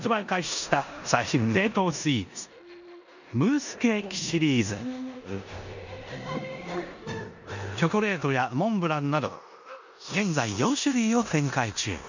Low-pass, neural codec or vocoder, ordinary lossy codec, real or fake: 7.2 kHz; codec, 16 kHz in and 24 kHz out, 0.9 kbps, LongCat-Audio-Codec, four codebook decoder; none; fake